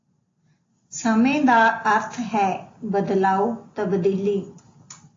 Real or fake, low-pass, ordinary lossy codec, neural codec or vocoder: real; 7.2 kHz; AAC, 32 kbps; none